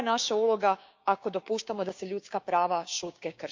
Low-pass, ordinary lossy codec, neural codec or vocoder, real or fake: 7.2 kHz; MP3, 64 kbps; codec, 16 kHz, 6 kbps, DAC; fake